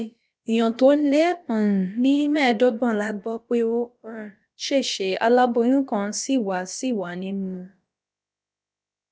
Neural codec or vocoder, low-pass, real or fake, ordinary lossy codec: codec, 16 kHz, about 1 kbps, DyCAST, with the encoder's durations; none; fake; none